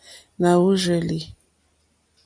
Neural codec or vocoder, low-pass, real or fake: none; 9.9 kHz; real